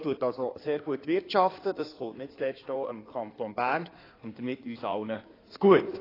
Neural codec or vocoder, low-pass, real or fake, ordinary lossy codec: codec, 16 kHz in and 24 kHz out, 2.2 kbps, FireRedTTS-2 codec; 5.4 kHz; fake; AAC, 24 kbps